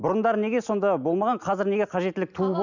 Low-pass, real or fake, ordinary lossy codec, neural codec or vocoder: 7.2 kHz; real; none; none